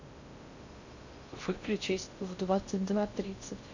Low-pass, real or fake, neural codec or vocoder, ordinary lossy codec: 7.2 kHz; fake; codec, 16 kHz in and 24 kHz out, 0.6 kbps, FocalCodec, streaming, 2048 codes; Opus, 64 kbps